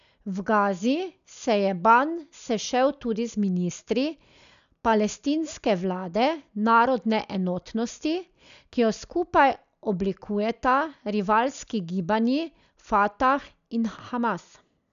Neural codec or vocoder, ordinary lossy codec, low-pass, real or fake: none; none; 7.2 kHz; real